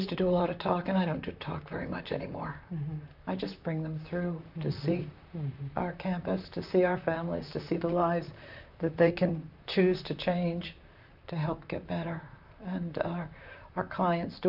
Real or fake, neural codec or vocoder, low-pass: fake; vocoder, 44.1 kHz, 128 mel bands, Pupu-Vocoder; 5.4 kHz